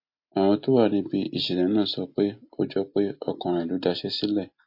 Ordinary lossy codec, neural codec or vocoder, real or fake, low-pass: MP3, 32 kbps; none; real; 5.4 kHz